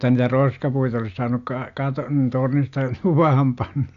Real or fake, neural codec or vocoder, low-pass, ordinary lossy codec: real; none; 7.2 kHz; AAC, 96 kbps